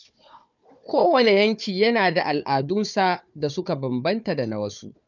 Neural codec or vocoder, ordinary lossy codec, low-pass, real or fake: codec, 16 kHz, 4 kbps, FunCodec, trained on Chinese and English, 50 frames a second; none; 7.2 kHz; fake